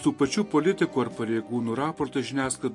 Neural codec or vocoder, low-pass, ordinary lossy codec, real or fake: none; 10.8 kHz; MP3, 48 kbps; real